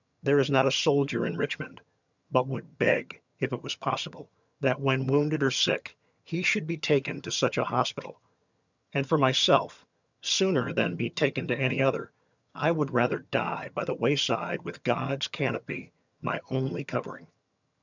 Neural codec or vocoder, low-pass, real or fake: vocoder, 22.05 kHz, 80 mel bands, HiFi-GAN; 7.2 kHz; fake